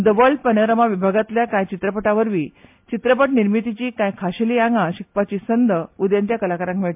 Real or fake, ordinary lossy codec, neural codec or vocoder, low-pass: real; MP3, 32 kbps; none; 3.6 kHz